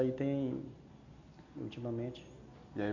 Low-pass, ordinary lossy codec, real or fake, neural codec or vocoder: 7.2 kHz; none; real; none